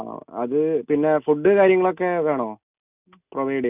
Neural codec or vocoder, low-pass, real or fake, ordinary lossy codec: none; 3.6 kHz; real; none